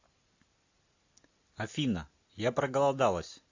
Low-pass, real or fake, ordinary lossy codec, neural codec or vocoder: 7.2 kHz; real; AAC, 48 kbps; none